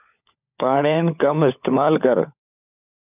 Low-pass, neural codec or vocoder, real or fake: 3.6 kHz; codec, 16 kHz, 16 kbps, FunCodec, trained on LibriTTS, 50 frames a second; fake